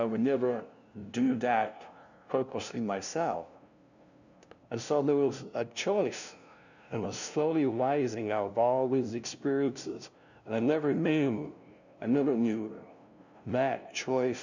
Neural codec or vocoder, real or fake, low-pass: codec, 16 kHz, 0.5 kbps, FunCodec, trained on LibriTTS, 25 frames a second; fake; 7.2 kHz